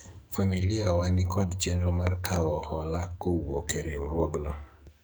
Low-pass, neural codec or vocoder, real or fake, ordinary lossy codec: none; codec, 44.1 kHz, 2.6 kbps, SNAC; fake; none